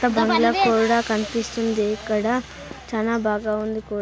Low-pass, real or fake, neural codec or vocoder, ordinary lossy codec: none; real; none; none